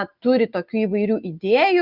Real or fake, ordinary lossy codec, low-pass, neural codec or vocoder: real; Opus, 64 kbps; 5.4 kHz; none